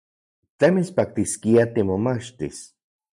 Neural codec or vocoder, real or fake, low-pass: none; real; 10.8 kHz